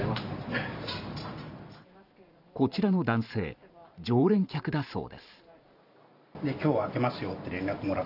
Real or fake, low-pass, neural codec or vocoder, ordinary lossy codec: fake; 5.4 kHz; vocoder, 44.1 kHz, 128 mel bands every 512 samples, BigVGAN v2; none